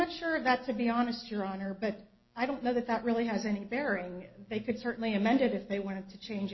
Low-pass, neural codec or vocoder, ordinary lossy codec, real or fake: 7.2 kHz; none; MP3, 24 kbps; real